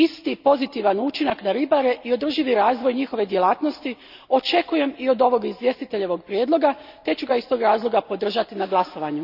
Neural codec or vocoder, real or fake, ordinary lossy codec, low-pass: none; real; none; 5.4 kHz